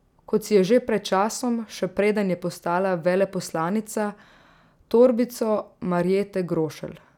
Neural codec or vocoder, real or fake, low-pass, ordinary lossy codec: none; real; 19.8 kHz; none